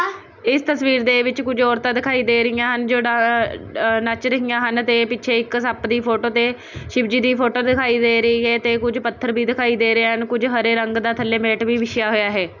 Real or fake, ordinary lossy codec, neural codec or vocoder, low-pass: real; none; none; 7.2 kHz